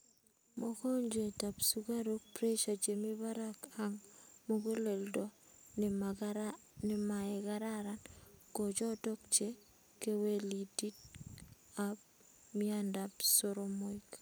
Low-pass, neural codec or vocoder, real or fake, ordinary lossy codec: none; none; real; none